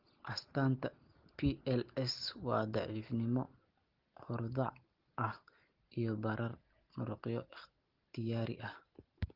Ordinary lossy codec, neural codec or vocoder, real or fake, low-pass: Opus, 16 kbps; none; real; 5.4 kHz